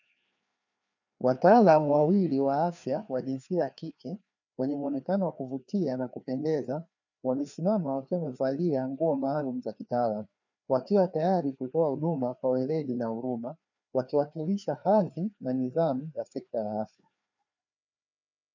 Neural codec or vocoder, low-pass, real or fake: codec, 16 kHz, 2 kbps, FreqCodec, larger model; 7.2 kHz; fake